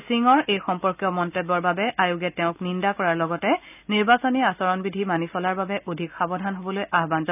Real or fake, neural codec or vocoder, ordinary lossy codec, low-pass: real; none; none; 3.6 kHz